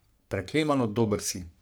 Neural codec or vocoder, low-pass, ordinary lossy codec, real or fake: codec, 44.1 kHz, 3.4 kbps, Pupu-Codec; none; none; fake